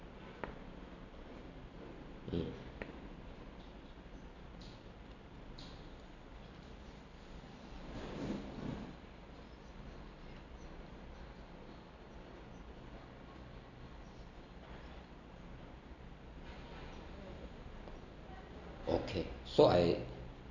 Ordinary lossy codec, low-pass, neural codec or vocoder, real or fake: none; 7.2 kHz; none; real